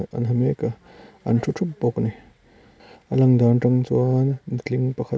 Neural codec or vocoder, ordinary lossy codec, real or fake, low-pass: none; none; real; none